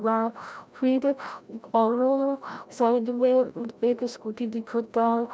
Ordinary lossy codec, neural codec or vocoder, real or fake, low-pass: none; codec, 16 kHz, 0.5 kbps, FreqCodec, larger model; fake; none